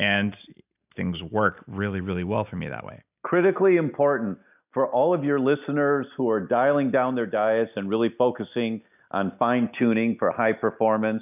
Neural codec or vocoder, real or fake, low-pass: none; real; 3.6 kHz